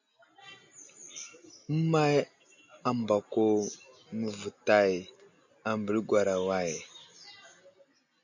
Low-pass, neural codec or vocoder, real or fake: 7.2 kHz; none; real